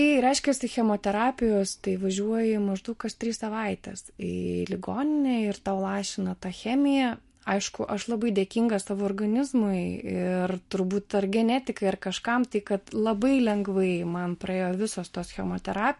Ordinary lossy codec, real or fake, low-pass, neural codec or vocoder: MP3, 48 kbps; real; 14.4 kHz; none